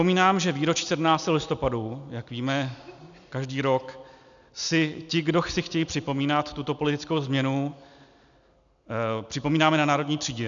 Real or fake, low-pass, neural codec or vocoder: real; 7.2 kHz; none